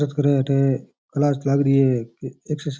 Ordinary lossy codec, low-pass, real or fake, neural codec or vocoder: none; none; real; none